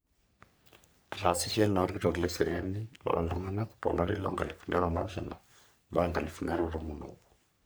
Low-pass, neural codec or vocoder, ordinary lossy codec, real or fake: none; codec, 44.1 kHz, 3.4 kbps, Pupu-Codec; none; fake